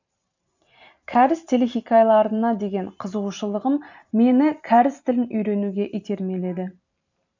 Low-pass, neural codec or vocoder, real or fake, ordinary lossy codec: 7.2 kHz; vocoder, 44.1 kHz, 128 mel bands every 512 samples, BigVGAN v2; fake; none